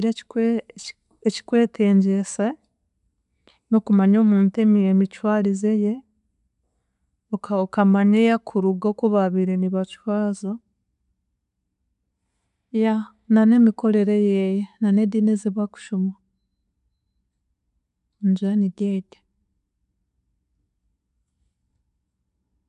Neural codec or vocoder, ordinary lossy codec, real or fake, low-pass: none; none; real; 10.8 kHz